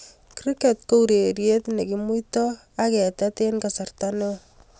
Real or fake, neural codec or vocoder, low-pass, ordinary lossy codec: real; none; none; none